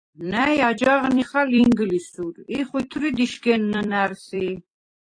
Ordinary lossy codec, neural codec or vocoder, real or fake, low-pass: AAC, 48 kbps; vocoder, 44.1 kHz, 128 mel bands every 256 samples, BigVGAN v2; fake; 9.9 kHz